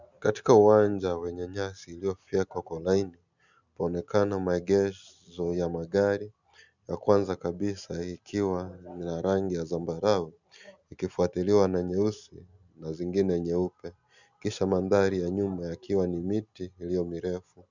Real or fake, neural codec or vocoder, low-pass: real; none; 7.2 kHz